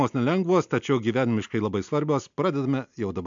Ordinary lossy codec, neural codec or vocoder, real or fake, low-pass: MP3, 64 kbps; none; real; 7.2 kHz